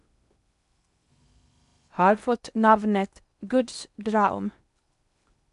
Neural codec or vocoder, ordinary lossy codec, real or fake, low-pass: codec, 16 kHz in and 24 kHz out, 0.8 kbps, FocalCodec, streaming, 65536 codes; MP3, 96 kbps; fake; 10.8 kHz